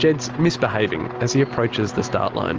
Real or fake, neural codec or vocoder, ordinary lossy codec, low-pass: real; none; Opus, 24 kbps; 7.2 kHz